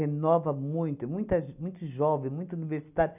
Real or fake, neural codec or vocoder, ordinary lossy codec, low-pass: real; none; none; 3.6 kHz